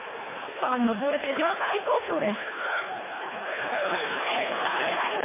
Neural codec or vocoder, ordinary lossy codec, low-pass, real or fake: codec, 24 kHz, 1.5 kbps, HILCodec; MP3, 16 kbps; 3.6 kHz; fake